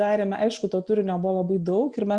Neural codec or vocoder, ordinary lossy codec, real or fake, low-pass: none; Opus, 64 kbps; real; 9.9 kHz